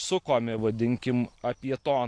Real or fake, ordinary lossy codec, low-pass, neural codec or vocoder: real; AAC, 48 kbps; 9.9 kHz; none